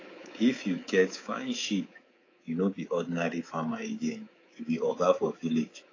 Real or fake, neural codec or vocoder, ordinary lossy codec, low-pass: fake; codec, 24 kHz, 3.1 kbps, DualCodec; AAC, 32 kbps; 7.2 kHz